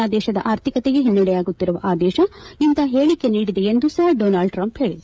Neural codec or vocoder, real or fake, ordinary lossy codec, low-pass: codec, 16 kHz, 16 kbps, FreqCodec, smaller model; fake; none; none